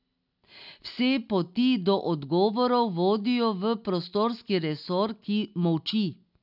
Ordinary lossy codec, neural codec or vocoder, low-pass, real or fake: none; none; 5.4 kHz; real